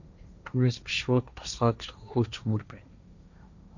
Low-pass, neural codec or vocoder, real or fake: 7.2 kHz; codec, 16 kHz, 1.1 kbps, Voila-Tokenizer; fake